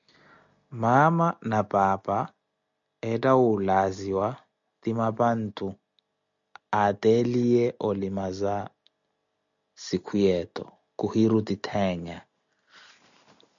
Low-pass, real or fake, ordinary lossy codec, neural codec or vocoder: 7.2 kHz; real; MP3, 96 kbps; none